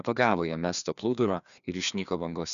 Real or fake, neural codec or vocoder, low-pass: fake; codec, 16 kHz, 2 kbps, FreqCodec, larger model; 7.2 kHz